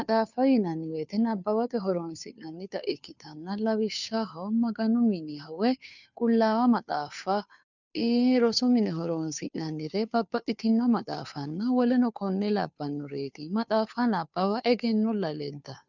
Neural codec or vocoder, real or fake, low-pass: codec, 16 kHz, 2 kbps, FunCodec, trained on Chinese and English, 25 frames a second; fake; 7.2 kHz